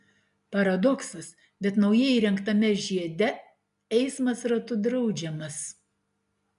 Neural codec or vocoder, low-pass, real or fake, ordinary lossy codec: none; 10.8 kHz; real; MP3, 96 kbps